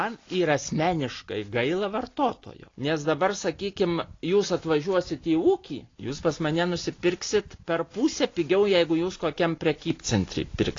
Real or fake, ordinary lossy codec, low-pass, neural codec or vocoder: real; AAC, 32 kbps; 7.2 kHz; none